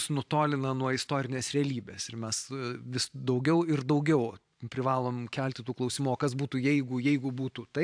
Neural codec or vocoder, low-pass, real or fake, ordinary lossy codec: vocoder, 44.1 kHz, 128 mel bands every 512 samples, BigVGAN v2; 9.9 kHz; fake; AAC, 64 kbps